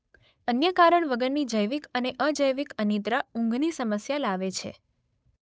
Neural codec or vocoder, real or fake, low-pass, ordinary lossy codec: codec, 16 kHz, 8 kbps, FunCodec, trained on Chinese and English, 25 frames a second; fake; none; none